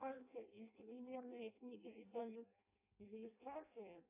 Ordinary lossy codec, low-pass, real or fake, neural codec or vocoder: AAC, 32 kbps; 3.6 kHz; fake; codec, 16 kHz in and 24 kHz out, 0.6 kbps, FireRedTTS-2 codec